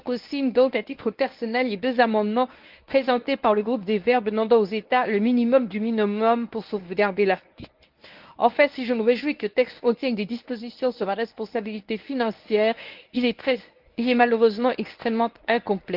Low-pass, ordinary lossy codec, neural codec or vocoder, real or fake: 5.4 kHz; Opus, 24 kbps; codec, 24 kHz, 0.9 kbps, WavTokenizer, medium speech release version 1; fake